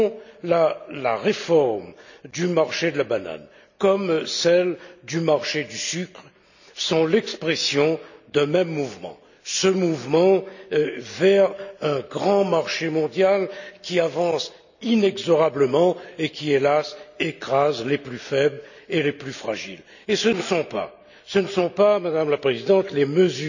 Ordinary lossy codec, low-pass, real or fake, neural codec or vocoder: none; 7.2 kHz; real; none